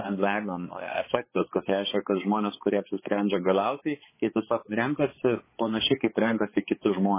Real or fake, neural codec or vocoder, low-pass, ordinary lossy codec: fake; codec, 16 kHz, 4 kbps, X-Codec, HuBERT features, trained on balanced general audio; 3.6 kHz; MP3, 16 kbps